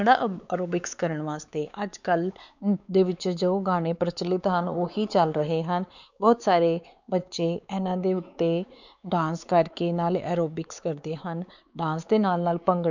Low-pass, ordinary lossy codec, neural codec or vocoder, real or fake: 7.2 kHz; none; codec, 16 kHz, 4 kbps, X-Codec, WavLM features, trained on Multilingual LibriSpeech; fake